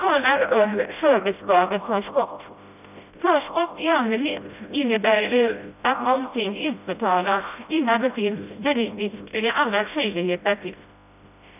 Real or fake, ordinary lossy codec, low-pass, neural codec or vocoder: fake; none; 3.6 kHz; codec, 16 kHz, 0.5 kbps, FreqCodec, smaller model